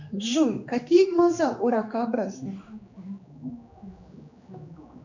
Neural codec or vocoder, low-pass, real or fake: codec, 16 kHz, 2 kbps, X-Codec, HuBERT features, trained on general audio; 7.2 kHz; fake